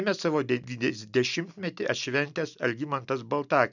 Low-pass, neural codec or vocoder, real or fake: 7.2 kHz; none; real